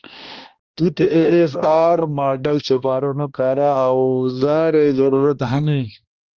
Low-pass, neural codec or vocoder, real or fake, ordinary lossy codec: 7.2 kHz; codec, 16 kHz, 1 kbps, X-Codec, HuBERT features, trained on balanced general audio; fake; Opus, 24 kbps